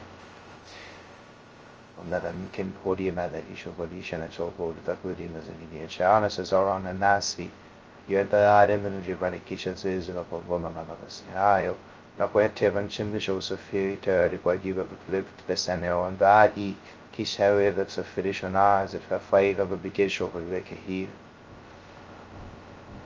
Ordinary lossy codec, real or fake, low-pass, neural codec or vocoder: Opus, 24 kbps; fake; 7.2 kHz; codec, 16 kHz, 0.2 kbps, FocalCodec